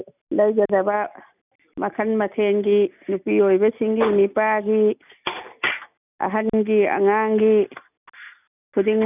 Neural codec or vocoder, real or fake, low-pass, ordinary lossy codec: none; real; 3.6 kHz; none